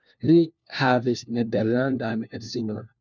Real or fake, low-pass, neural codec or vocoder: fake; 7.2 kHz; codec, 16 kHz, 1 kbps, FunCodec, trained on LibriTTS, 50 frames a second